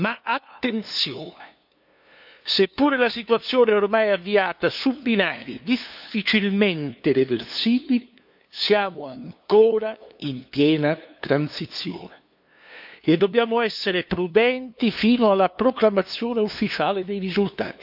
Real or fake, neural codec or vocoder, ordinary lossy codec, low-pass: fake; codec, 16 kHz, 2 kbps, FunCodec, trained on LibriTTS, 25 frames a second; none; 5.4 kHz